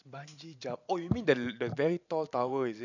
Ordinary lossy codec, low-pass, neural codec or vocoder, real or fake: none; 7.2 kHz; vocoder, 44.1 kHz, 128 mel bands every 512 samples, BigVGAN v2; fake